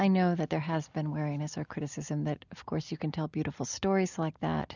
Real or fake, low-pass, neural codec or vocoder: real; 7.2 kHz; none